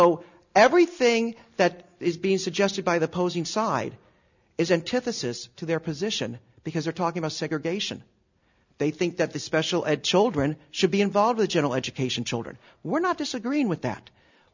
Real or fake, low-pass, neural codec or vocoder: real; 7.2 kHz; none